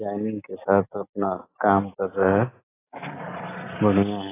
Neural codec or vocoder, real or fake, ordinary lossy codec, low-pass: none; real; AAC, 16 kbps; 3.6 kHz